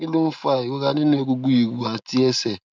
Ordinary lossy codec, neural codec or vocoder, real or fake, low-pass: none; none; real; none